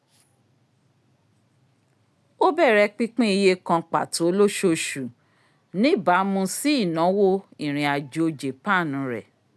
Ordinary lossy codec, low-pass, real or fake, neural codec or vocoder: none; none; real; none